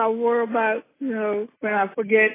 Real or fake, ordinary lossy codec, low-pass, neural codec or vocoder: real; AAC, 16 kbps; 3.6 kHz; none